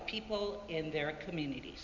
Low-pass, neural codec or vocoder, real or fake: 7.2 kHz; none; real